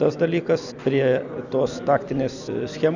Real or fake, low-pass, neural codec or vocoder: real; 7.2 kHz; none